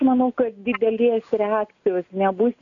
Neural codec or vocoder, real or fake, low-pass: none; real; 7.2 kHz